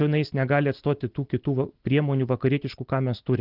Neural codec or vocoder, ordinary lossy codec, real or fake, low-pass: none; Opus, 16 kbps; real; 5.4 kHz